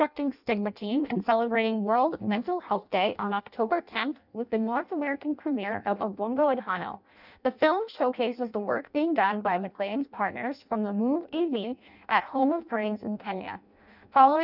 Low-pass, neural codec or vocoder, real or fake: 5.4 kHz; codec, 16 kHz in and 24 kHz out, 0.6 kbps, FireRedTTS-2 codec; fake